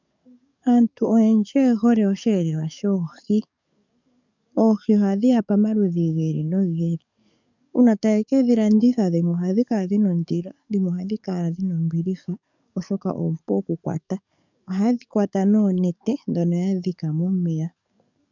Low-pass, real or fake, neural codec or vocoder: 7.2 kHz; fake; codec, 44.1 kHz, 7.8 kbps, DAC